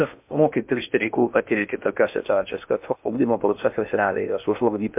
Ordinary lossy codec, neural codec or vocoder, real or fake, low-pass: MP3, 32 kbps; codec, 16 kHz in and 24 kHz out, 0.6 kbps, FocalCodec, streaming, 2048 codes; fake; 3.6 kHz